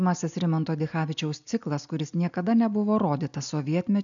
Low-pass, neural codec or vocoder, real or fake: 7.2 kHz; none; real